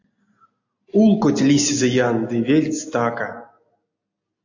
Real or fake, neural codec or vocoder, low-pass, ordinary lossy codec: real; none; 7.2 kHz; AAC, 48 kbps